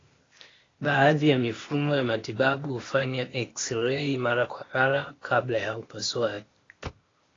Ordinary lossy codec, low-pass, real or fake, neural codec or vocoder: AAC, 32 kbps; 7.2 kHz; fake; codec, 16 kHz, 0.8 kbps, ZipCodec